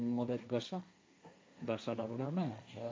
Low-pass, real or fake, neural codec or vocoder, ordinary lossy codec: none; fake; codec, 16 kHz, 1.1 kbps, Voila-Tokenizer; none